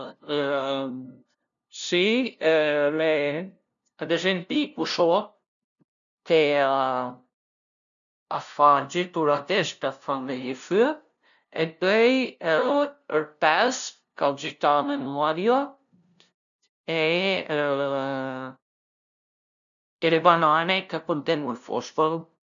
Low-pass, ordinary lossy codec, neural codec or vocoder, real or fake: 7.2 kHz; none; codec, 16 kHz, 0.5 kbps, FunCodec, trained on LibriTTS, 25 frames a second; fake